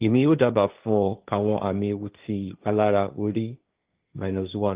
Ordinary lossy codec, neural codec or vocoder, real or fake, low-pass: Opus, 32 kbps; codec, 16 kHz, 1.1 kbps, Voila-Tokenizer; fake; 3.6 kHz